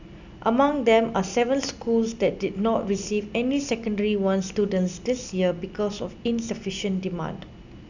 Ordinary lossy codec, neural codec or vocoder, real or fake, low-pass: none; none; real; 7.2 kHz